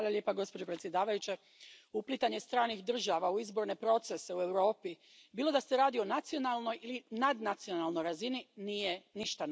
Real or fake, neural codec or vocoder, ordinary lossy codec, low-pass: real; none; none; none